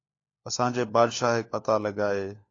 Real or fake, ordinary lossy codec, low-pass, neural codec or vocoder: fake; AAC, 32 kbps; 7.2 kHz; codec, 16 kHz, 4 kbps, FunCodec, trained on LibriTTS, 50 frames a second